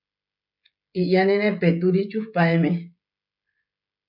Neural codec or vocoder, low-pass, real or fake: codec, 16 kHz, 16 kbps, FreqCodec, smaller model; 5.4 kHz; fake